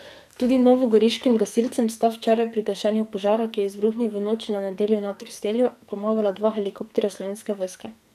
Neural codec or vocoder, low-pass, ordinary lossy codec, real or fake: codec, 44.1 kHz, 2.6 kbps, SNAC; 14.4 kHz; none; fake